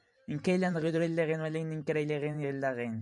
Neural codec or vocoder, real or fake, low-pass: vocoder, 44.1 kHz, 128 mel bands every 512 samples, BigVGAN v2; fake; 10.8 kHz